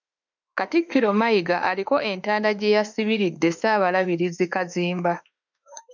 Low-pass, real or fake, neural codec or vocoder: 7.2 kHz; fake; autoencoder, 48 kHz, 32 numbers a frame, DAC-VAE, trained on Japanese speech